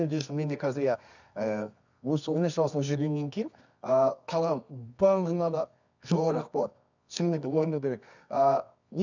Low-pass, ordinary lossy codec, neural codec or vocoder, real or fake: 7.2 kHz; none; codec, 24 kHz, 0.9 kbps, WavTokenizer, medium music audio release; fake